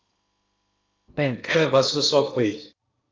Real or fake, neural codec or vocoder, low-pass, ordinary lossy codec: fake; codec, 16 kHz in and 24 kHz out, 0.8 kbps, FocalCodec, streaming, 65536 codes; 7.2 kHz; Opus, 24 kbps